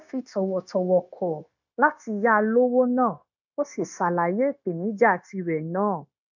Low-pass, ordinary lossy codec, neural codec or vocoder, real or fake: 7.2 kHz; none; codec, 16 kHz, 0.9 kbps, LongCat-Audio-Codec; fake